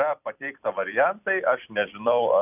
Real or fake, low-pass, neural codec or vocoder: fake; 3.6 kHz; vocoder, 44.1 kHz, 128 mel bands every 256 samples, BigVGAN v2